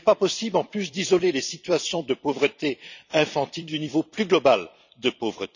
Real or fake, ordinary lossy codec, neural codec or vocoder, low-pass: fake; none; vocoder, 44.1 kHz, 128 mel bands every 256 samples, BigVGAN v2; 7.2 kHz